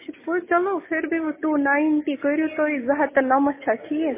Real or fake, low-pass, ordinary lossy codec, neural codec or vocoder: real; 3.6 kHz; MP3, 16 kbps; none